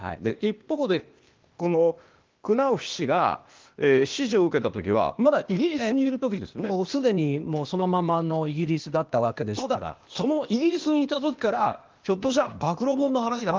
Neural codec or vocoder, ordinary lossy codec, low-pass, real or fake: codec, 16 kHz, 0.8 kbps, ZipCodec; Opus, 32 kbps; 7.2 kHz; fake